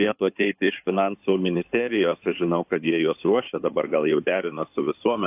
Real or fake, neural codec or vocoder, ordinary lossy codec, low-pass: fake; codec, 16 kHz in and 24 kHz out, 2.2 kbps, FireRedTTS-2 codec; AAC, 32 kbps; 3.6 kHz